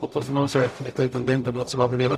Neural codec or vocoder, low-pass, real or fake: codec, 44.1 kHz, 0.9 kbps, DAC; 14.4 kHz; fake